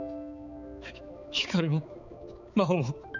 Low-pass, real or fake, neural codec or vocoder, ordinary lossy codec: 7.2 kHz; fake; codec, 16 kHz, 4 kbps, X-Codec, HuBERT features, trained on balanced general audio; none